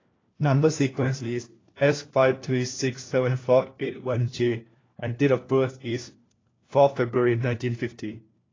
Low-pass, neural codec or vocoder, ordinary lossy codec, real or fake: 7.2 kHz; codec, 16 kHz, 1 kbps, FunCodec, trained on LibriTTS, 50 frames a second; AAC, 32 kbps; fake